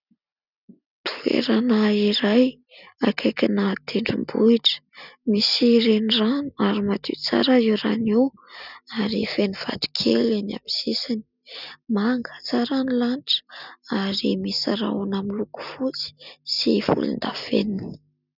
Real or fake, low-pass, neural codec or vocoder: real; 5.4 kHz; none